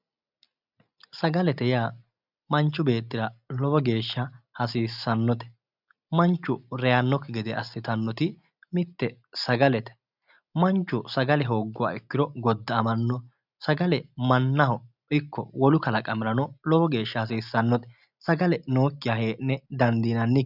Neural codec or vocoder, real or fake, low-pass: none; real; 5.4 kHz